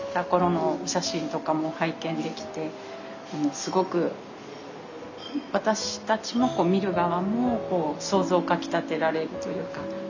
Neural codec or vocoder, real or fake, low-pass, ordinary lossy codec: none; real; 7.2 kHz; none